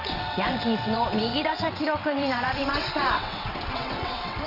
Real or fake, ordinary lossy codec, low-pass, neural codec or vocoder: fake; none; 5.4 kHz; vocoder, 22.05 kHz, 80 mel bands, WaveNeXt